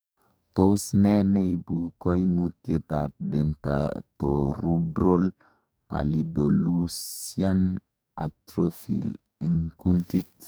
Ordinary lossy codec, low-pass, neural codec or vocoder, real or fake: none; none; codec, 44.1 kHz, 2.6 kbps, DAC; fake